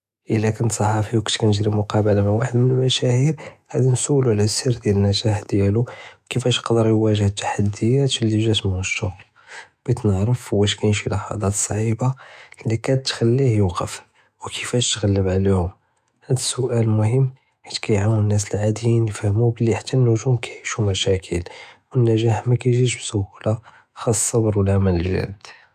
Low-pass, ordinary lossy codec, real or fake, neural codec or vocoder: 14.4 kHz; none; fake; autoencoder, 48 kHz, 128 numbers a frame, DAC-VAE, trained on Japanese speech